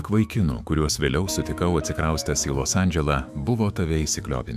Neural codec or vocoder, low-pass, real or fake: autoencoder, 48 kHz, 128 numbers a frame, DAC-VAE, trained on Japanese speech; 14.4 kHz; fake